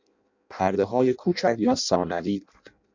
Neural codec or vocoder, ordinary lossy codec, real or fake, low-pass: codec, 16 kHz in and 24 kHz out, 0.6 kbps, FireRedTTS-2 codec; MP3, 64 kbps; fake; 7.2 kHz